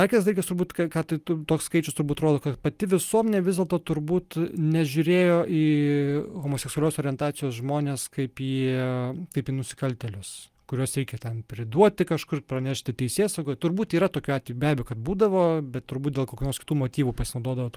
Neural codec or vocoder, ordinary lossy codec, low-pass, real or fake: none; Opus, 32 kbps; 14.4 kHz; real